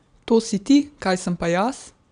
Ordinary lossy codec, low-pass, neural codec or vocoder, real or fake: MP3, 96 kbps; 9.9 kHz; none; real